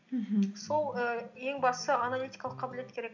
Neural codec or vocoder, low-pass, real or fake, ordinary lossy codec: none; 7.2 kHz; real; none